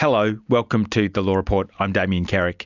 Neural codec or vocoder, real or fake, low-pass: none; real; 7.2 kHz